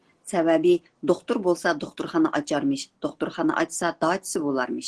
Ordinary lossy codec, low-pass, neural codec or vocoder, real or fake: Opus, 16 kbps; 10.8 kHz; none; real